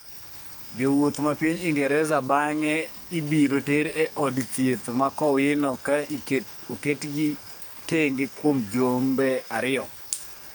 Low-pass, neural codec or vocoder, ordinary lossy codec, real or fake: none; codec, 44.1 kHz, 2.6 kbps, SNAC; none; fake